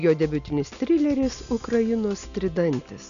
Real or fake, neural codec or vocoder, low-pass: real; none; 7.2 kHz